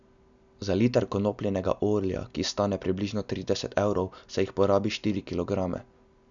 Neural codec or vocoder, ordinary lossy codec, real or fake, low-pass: none; none; real; 7.2 kHz